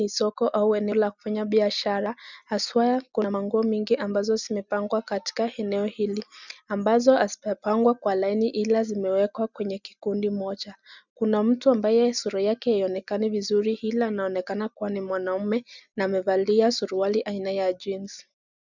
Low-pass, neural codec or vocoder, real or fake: 7.2 kHz; none; real